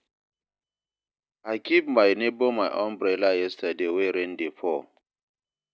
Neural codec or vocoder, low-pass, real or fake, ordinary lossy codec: none; none; real; none